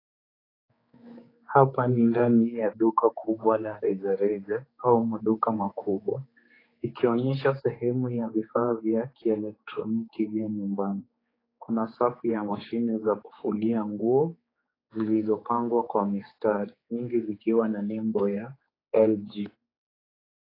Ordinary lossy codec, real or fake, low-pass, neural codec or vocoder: AAC, 24 kbps; fake; 5.4 kHz; codec, 16 kHz, 4 kbps, X-Codec, HuBERT features, trained on general audio